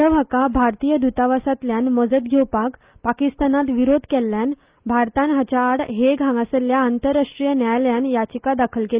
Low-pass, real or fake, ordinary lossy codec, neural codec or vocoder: 3.6 kHz; real; Opus, 24 kbps; none